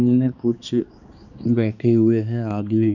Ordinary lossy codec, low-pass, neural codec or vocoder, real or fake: none; 7.2 kHz; codec, 16 kHz, 2 kbps, X-Codec, HuBERT features, trained on balanced general audio; fake